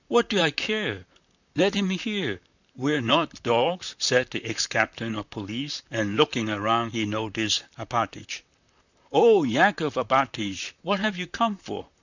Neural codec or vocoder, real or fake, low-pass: vocoder, 44.1 kHz, 128 mel bands, Pupu-Vocoder; fake; 7.2 kHz